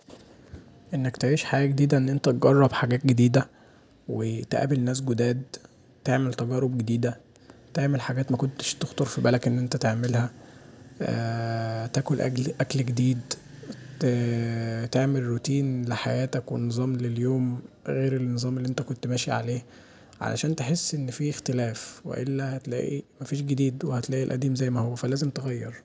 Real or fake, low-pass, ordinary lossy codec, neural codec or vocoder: real; none; none; none